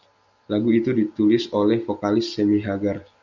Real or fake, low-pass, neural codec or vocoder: real; 7.2 kHz; none